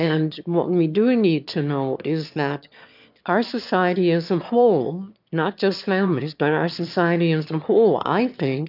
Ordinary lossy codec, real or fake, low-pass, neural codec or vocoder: AAC, 48 kbps; fake; 5.4 kHz; autoencoder, 22.05 kHz, a latent of 192 numbers a frame, VITS, trained on one speaker